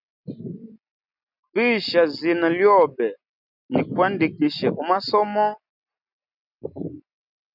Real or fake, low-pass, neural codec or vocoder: real; 5.4 kHz; none